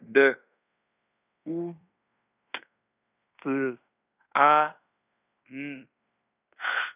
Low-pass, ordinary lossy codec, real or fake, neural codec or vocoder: 3.6 kHz; none; fake; codec, 16 kHz in and 24 kHz out, 0.9 kbps, LongCat-Audio-Codec, fine tuned four codebook decoder